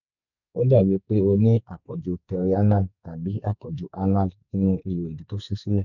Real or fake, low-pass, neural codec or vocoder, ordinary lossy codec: fake; 7.2 kHz; codec, 44.1 kHz, 2.6 kbps, SNAC; none